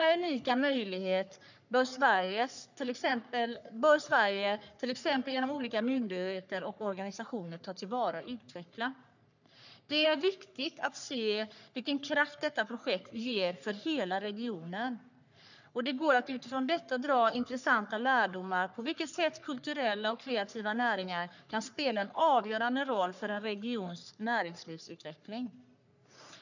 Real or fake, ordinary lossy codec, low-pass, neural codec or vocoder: fake; none; 7.2 kHz; codec, 44.1 kHz, 3.4 kbps, Pupu-Codec